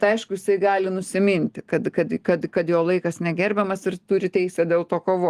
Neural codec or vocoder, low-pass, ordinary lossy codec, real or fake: none; 14.4 kHz; Opus, 24 kbps; real